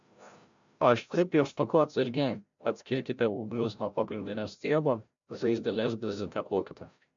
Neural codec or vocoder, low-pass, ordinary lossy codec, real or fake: codec, 16 kHz, 0.5 kbps, FreqCodec, larger model; 7.2 kHz; MP3, 64 kbps; fake